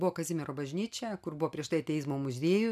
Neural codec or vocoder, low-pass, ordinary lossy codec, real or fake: none; 14.4 kHz; MP3, 96 kbps; real